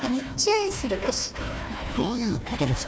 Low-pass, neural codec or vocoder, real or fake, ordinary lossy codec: none; codec, 16 kHz, 1 kbps, FunCodec, trained on Chinese and English, 50 frames a second; fake; none